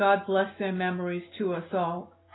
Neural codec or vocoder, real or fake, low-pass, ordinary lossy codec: none; real; 7.2 kHz; AAC, 16 kbps